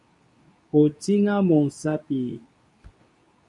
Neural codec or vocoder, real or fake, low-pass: codec, 24 kHz, 0.9 kbps, WavTokenizer, medium speech release version 2; fake; 10.8 kHz